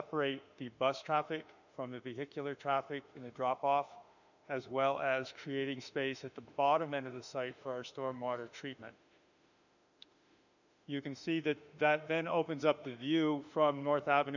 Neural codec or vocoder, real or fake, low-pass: autoencoder, 48 kHz, 32 numbers a frame, DAC-VAE, trained on Japanese speech; fake; 7.2 kHz